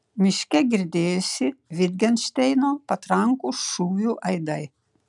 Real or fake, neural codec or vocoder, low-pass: real; none; 10.8 kHz